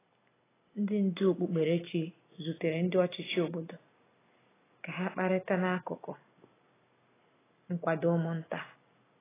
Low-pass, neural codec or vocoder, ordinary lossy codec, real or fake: 3.6 kHz; none; AAC, 16 kbps; real